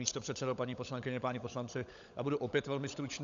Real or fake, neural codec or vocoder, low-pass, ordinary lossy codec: fake; codec, 16 kHz, 16 kbps, FunCodec, trained on LibriTTS, 50 frames a second; 7.2 kHz; Opus, 64 kbps